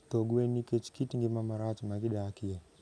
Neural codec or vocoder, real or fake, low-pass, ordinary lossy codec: none; real; none; none